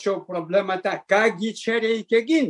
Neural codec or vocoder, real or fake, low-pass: none; real; 10.8 kHz